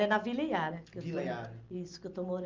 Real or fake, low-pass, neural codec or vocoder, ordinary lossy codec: real; 7.2 kHz; none; Opus, 24 kbps